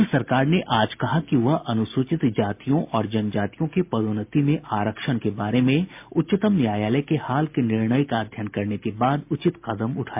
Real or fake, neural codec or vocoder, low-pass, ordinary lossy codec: real; none; 3.6 kHz; MP3, 32 kbps